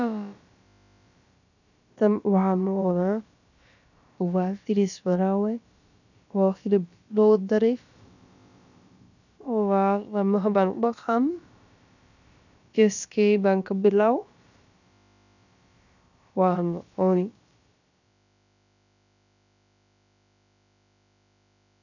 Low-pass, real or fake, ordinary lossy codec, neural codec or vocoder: 7.2 kHz; fake; none; codec, 16 kHz, about 1 kbps, DyCAST, with the encoder's durations